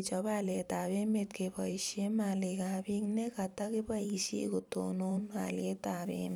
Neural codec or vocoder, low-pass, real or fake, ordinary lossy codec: vocoder, 44.1 kHz, 128 mel bands every 512 samples, BigVGAN v2; none; fake; none